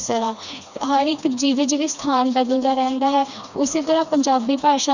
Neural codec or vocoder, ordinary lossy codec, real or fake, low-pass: codec, 16 kHz, 2 kbps, FreqCodec, smaller model; none; fake; 7.2 kHz